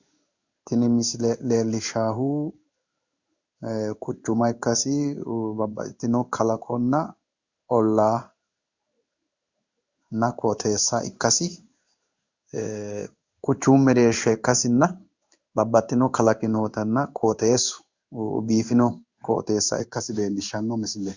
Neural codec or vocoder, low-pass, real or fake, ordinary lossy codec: codec, 16 kHz in and 24 kHz out, 1 kbps, XY-Tokenizer; 7.2 kHz; fake; Opus, 64 kbps